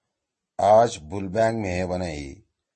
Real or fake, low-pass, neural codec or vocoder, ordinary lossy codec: real; 9.9 kHz; none; MP3, 32 kbps